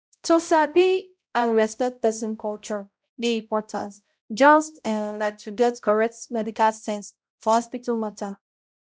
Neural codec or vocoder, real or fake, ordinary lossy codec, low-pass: codec, 16 kHz, 0.5 kbps, X-Codec, HuBERT features, trained on balanced general audio; fake; none; none